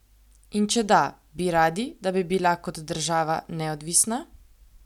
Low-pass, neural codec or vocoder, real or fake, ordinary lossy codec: 19.8 kHz; none; real; none